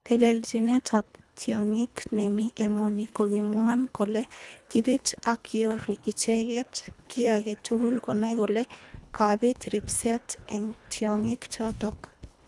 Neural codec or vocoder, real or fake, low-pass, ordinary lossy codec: codec, 24 kHz, 1.5 kbps, HILCodec; fake; none; none